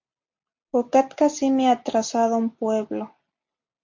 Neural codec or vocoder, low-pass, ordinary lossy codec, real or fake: none; 7.2 kHz; MP3, 48 kbps; real